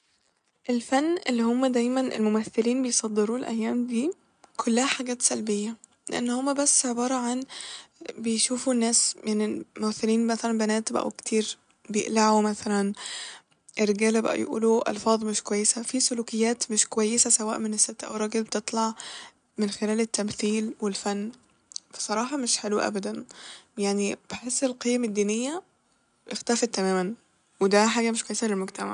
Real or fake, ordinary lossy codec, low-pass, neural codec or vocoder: real; none; 9.9 kHz; none